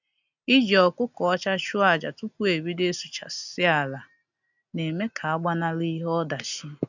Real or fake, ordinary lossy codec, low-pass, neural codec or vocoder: real; none; 7.2 kHz; none